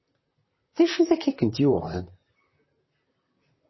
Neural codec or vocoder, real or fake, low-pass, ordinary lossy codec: vocoder, 44.1 kHz, 128 mel bands, Pupu-Vocoder; fake; 7.2 kHz; MP3, 24 kbps